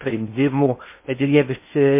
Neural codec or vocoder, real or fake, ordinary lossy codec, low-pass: codec, 16 kHz in and 24 kHz out, 0.6 kbps, FocalCodec, streaming, 2048 codes; fake; MP3, 24 kbps; 3.6 kHz